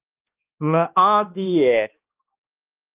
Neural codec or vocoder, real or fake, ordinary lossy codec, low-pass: codec, 16 kHz, 1 kbps, X-Codec, HuBERT features, trained on balanced general audio; fake; Opus, 24 kbps; 3.6 kHz